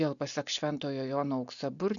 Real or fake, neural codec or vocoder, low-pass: real; none; 7.2 kHz